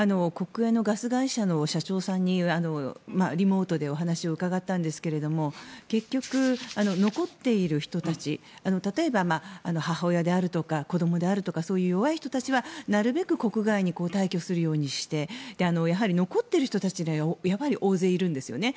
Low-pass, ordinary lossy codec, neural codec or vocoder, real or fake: none; none; none; real